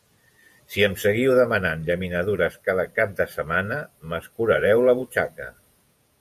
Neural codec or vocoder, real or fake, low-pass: vocoder, 48 kHz, 128 mel bands, Vocos; fake; 14.4 kHz